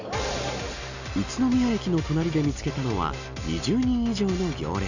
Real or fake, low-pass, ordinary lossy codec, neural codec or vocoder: real; 7.2 kHz; none; none